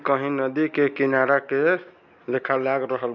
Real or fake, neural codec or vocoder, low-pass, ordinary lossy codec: real; none; 7.2 kHz; none